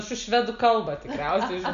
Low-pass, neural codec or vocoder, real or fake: 7.2 kHz; none; real